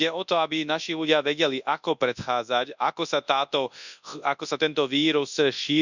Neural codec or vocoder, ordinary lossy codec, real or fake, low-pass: codec, 24 kHz, 0.9 kbps, WavTokenizer, large speech release; none; fake; 7.2 kHz